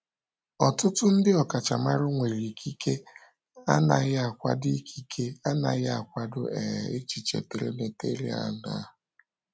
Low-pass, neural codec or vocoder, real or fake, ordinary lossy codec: none; none; real; none